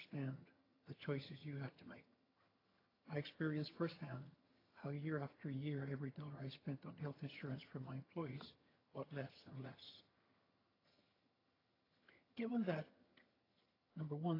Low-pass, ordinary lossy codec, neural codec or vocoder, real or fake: 5.4 kHz; AAC, 24 kbps; vocoder, 22.05 kHz, 80 mel bands, HiFi-GAN; fake